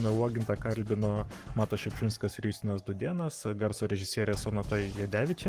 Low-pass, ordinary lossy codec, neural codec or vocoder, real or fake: 14.4 kHz; Opus, 24 kbps; codec, 44.1 kHz, 7.8 kbps, Pupu-Codec; fake